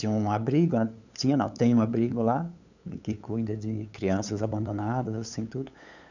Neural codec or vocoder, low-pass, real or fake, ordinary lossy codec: codec, 16 kHz, 8 kbps, FunCodec, trained on LibriTTS, 25 frames a second; 7.2 kHz; fake; none